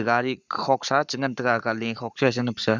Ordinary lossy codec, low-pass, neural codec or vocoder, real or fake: none; 7.2 kHz; codec, 44.1 kHz, 7.8 kbps, Pupu-Codec; fake